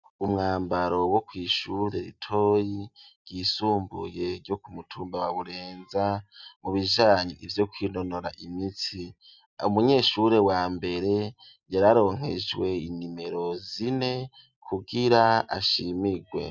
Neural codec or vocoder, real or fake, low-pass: none; real; 7.2 kHz